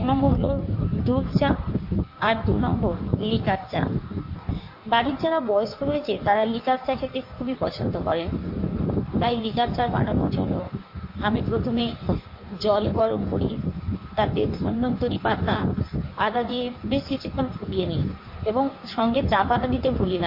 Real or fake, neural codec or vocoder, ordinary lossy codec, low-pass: fake; codec, 16 kHz in and 24 kHz out, 1.1 kbps, FireRedTTS-2 codec; MP3, 32 kbps; 5.4 kHz